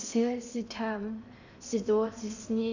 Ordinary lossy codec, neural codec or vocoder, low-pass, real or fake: none; codec, 16 kHz in and 24 kHz out, 0.8 kbps, FocalCodec, streaming, 65536 codes; 7.2 kHz; fake